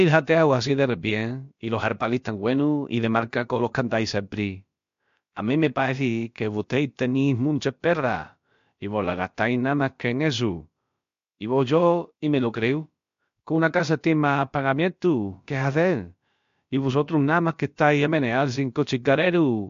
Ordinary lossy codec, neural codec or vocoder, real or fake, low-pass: MP3, 48 kbps; codec, 16 kHz, about 1 kbps, DyCAST, with the encoder's durations; fake; 7.2 kHz